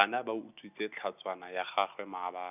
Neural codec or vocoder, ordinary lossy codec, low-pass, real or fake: none; none; 3.6 kHz; real